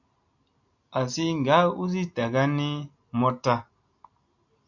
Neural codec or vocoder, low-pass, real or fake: none; 7.2 kHz; real